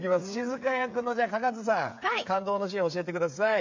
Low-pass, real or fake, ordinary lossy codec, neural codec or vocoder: 7.2 kHz; fake; MP3, 64 kbps; codec, 16 kHz, 8 kbps, FreqCodec, smaller model